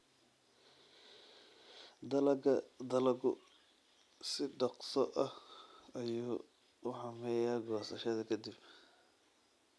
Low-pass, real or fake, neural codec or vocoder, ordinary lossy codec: none; real; none; none